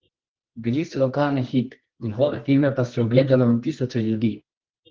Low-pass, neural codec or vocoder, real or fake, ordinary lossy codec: 7.2 kHz; codec, 24 kHz, 0.9 kbps, WavTokenizer, medium music audio release; fake; Opus, 16 kbps